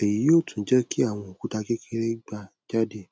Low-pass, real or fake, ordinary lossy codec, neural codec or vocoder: none; real; none; none